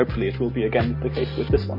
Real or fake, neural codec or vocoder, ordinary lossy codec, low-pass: real; none; MP3, 24 kbps; 5.4 kHz